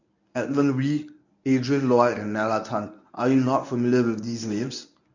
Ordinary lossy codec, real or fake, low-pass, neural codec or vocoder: none; fake; 7.2 kHz; codec, 24 kHz, 0.9 kbps, WavTokenizer, medium speech release version 1